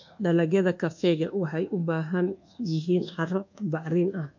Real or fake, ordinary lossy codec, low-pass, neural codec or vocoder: fake; MP3, 48 kbps; 7.2 kHz; codec, 24 kHz, 1.2 kbps, DualCodec